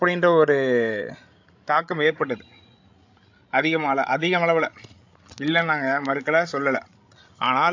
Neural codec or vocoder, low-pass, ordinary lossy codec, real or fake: codec, 16 kHz, 16 kbps, FreqCodec, larger model; 7.2 kHz; AAC, 48 kbps; fake